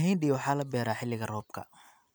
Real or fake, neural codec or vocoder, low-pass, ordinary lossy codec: fake; vocoder, 44.1 kHz, 128 mel bands every 256 samples, BigVGAN v2; none; none